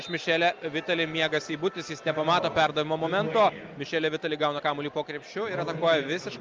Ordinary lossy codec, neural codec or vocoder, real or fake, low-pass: Opus, 24 kbps; none; real; 7.2 kHz